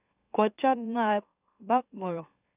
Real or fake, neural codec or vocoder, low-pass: fake; autoencoder, 44.1 kHz, a latent of 192 numbers a frame, MeloTTS; 3.6 kHz